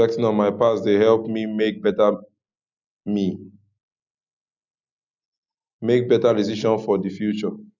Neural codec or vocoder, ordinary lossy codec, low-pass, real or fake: none; none; 7.2 kHz; real